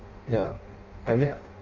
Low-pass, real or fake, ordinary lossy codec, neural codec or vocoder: 7.2 kHz; fake; Opus, 64 kbps; codec, 16 kHz in and 24 kHz out, 0.6 kbps, FireRedTTS-2 codec